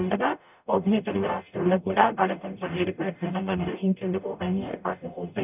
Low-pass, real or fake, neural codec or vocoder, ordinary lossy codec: 3.6 kHz; fake; codec, 44.1 kHz, 0.9 kbps, DAC; none